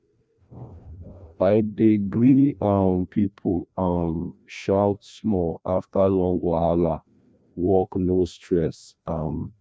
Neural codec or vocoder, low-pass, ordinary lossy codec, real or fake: codec, 16 kHz, 1 kbps, FreqCodec, larger model; none; none; fake